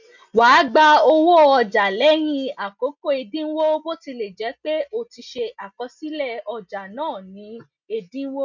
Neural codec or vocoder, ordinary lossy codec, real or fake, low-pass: none; none; real; 7.2 kHz